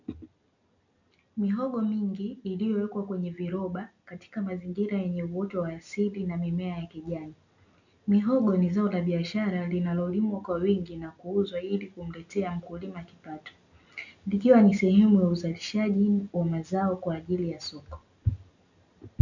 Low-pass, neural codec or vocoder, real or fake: 7.2 kHz; none; real